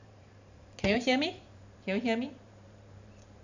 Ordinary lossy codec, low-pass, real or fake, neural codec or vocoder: none; 7.2 kHz; fake; vocoder, 44.1 kHz, 128 mel bands every 512 samples, BigVGAN v2